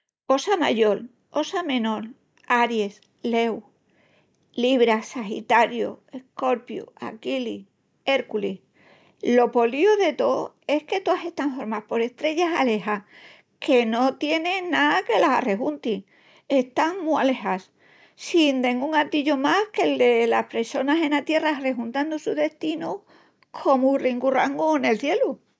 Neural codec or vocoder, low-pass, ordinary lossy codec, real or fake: none; none; none; real